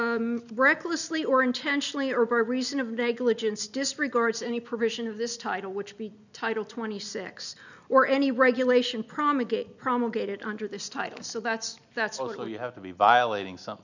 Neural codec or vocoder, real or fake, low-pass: none; real; 7.2 kHz